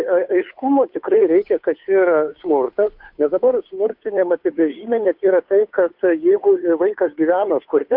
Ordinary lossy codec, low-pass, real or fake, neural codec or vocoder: MP3, 48 kbps; 5.4 kHz; fake; codec, 16 kHz, 2 kbps, FunCodec, trained on Chinese and English, 25 frames a second